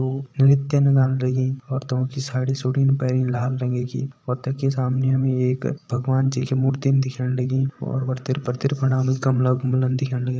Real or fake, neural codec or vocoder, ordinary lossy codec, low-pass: fake; codec, 16 kHz, 8 kbps, FreqCodec, larger model; none; none